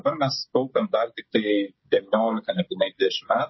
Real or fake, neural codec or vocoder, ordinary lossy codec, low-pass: fake; codec, 16 kHz, 16 kbps, FreqCodec, smaller model; MP3, 24 kbps; 7.2 kHz